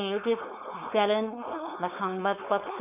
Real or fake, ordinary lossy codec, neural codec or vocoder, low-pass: fake; none; codec, 16 kHz, 4.8 kbps, FACodec; 3.6 kHz